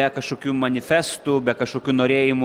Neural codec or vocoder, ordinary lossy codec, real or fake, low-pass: none; Opus, 24 kbps; real; 19.8 kHz